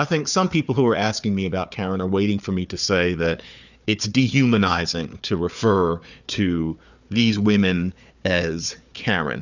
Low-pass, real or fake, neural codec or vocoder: 7.2 kHz; fake; codec, 16 kHz, 4 kbps, FunCodec, trained on Chinese and English, 50 frames a second